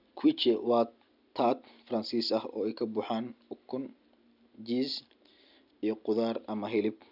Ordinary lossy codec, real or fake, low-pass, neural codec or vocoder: none; real; 5.4 kHz; none